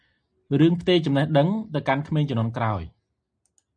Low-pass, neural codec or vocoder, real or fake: 9.9 kHz; none; real